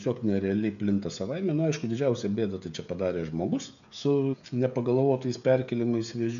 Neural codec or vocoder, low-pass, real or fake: codec, 16 kHz, 16 kbps, FreqCodec, smaller model; 7.2 kHz; fake